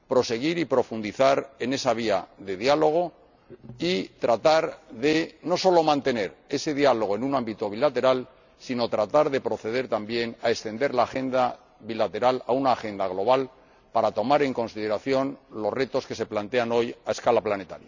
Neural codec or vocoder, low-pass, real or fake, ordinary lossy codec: none; 7.2 kHz; real; none